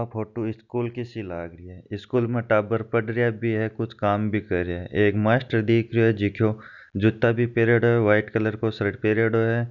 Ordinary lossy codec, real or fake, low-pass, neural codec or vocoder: none; real; 7.2 kHz; none